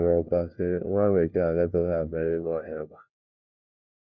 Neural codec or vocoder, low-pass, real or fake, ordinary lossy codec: codec, 16 kHz, 1 kbps, FunCodec, trained on LibriTTS, 50 frames a second; 7.2 kHz; fake; none